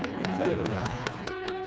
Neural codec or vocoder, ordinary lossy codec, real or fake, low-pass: codec, 16 kHz, 2 kbps, FreqCodec, smaller model; none; fake; none